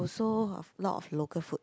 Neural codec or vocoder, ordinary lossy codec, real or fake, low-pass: none; none; real; none